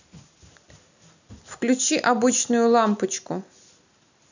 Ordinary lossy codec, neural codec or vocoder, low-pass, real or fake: none; none; 7.2 kHz; real